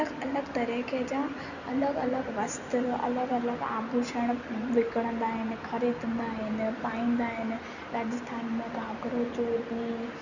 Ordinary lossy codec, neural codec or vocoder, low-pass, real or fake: none; none; 7.2 kHz; real